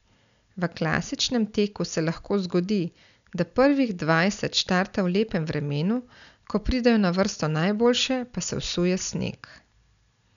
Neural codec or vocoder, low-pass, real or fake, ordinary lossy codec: none; 7.2 kHz; real; none